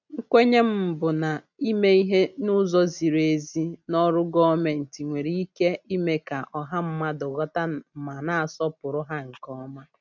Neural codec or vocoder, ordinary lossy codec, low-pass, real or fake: none; none; 7.2 kHz; real